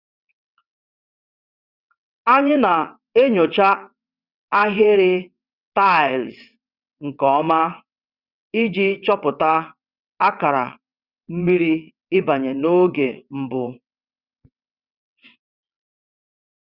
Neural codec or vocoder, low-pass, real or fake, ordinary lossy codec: vocoder, 22.05 kHz, 80 mel bands, WaveNeXt; 5.4 kHz; fake; Opus, 64 kbps